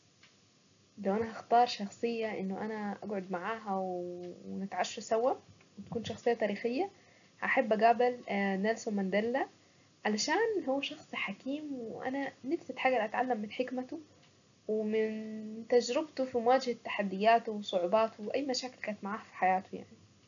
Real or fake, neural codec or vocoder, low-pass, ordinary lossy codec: real; none; 7.2 kHz; none